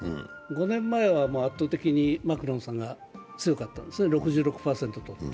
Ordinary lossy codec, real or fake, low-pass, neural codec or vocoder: none; real; none; none